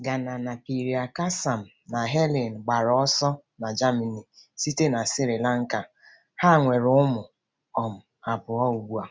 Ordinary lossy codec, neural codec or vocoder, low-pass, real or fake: none; none; none; real